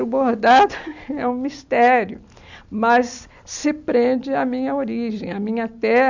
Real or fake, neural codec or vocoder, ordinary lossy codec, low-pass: real; none; none; 7.2 kHz